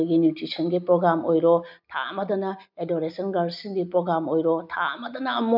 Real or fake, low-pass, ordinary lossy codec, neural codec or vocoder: real; 5.4 kHz; none; none